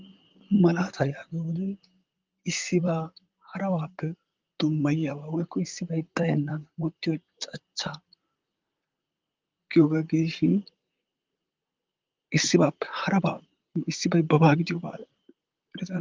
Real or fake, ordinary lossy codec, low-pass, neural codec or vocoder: fake; Opus, 32 kbps; 7.2 kHz; vocoder, 44.1 kHz, 128 mel bands, Pupu-Vocoder